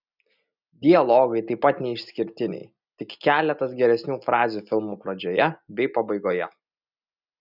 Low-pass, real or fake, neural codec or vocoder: 5.4 kHz; real; none